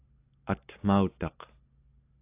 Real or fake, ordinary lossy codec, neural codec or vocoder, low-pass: real; AAC, 32 kbps; none; 3.6 kHz